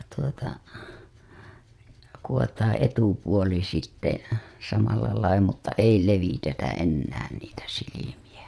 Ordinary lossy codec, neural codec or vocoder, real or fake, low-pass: none; vocoder, 22.05 kHz, 80 mel bands, WaveNeXt; fake; none